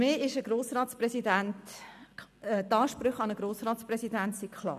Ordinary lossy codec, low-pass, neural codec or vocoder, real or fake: none; 14.4 kHz; none; real